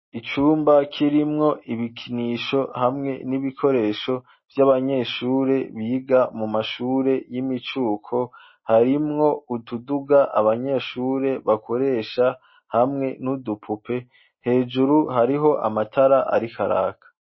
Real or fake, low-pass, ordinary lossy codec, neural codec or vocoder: real; 7.2 kHz; MP3, 24 kbps; none